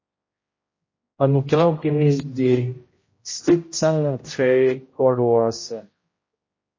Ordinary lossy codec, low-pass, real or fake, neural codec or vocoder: MP3, 32 kbps; 7.2 kHz; fake; codec, 16 kHz, 0.5 kbps, X-Codec, HuBERT features, trained on general audio